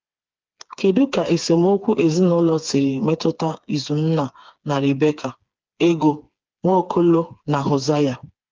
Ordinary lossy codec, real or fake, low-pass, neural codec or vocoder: Opus, 32 kbps; fake; 7.2 kHz; codec, 16 kHz, 4 kbps, FreqCodec, smaller model